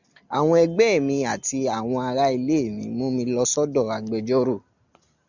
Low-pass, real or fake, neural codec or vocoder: 7.2 kHz; real; none